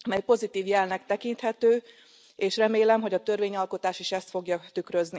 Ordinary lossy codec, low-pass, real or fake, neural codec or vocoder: none; none; real; none